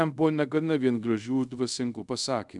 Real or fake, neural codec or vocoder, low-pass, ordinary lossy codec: fake; codec, 24 kHz, 0.5 kbps, DualCodec; 10.8 kHz; MP3, 96 kbps